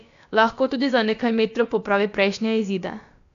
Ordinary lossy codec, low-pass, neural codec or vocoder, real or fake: none; 7.2 kHz; codec, 16 kHz, about 1 kbps, DyCAST, with the encoder's durations; fake